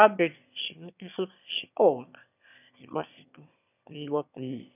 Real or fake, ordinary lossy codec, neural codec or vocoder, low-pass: fake; none; autoencoder, 22.05 kHz, a latent of 192 numbers a frame, VITS, trained on one speaker; 3.6 kHz